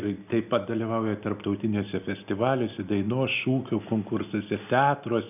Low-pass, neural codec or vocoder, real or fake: 3.6 kHz; none; real